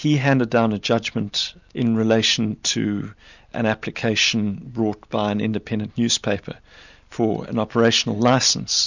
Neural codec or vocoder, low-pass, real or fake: none; 7.2 kHz; real